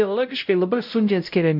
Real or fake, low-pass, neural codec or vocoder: fake; 5.4 kHz; codec, 16 kHz, 0.5 kbps, X-Codec, WavLM features, trained on Multilingual LibriSpeech